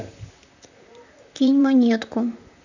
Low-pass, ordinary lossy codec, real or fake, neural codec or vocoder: 7.2 kHz; none; fake; vocoder, 44.1 kHz, 128 mel bands, Pupu-Vocoder